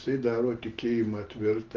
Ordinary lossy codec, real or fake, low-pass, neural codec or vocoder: Opus, 16 kbps; real; 7.2 kHz; none